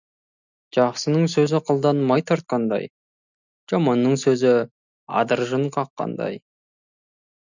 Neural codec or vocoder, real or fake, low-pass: none; real; 7.2 kHz